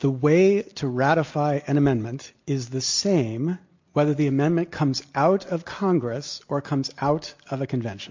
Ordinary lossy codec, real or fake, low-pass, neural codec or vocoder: MP3, 48 kbps; real; 7.2 kHz; none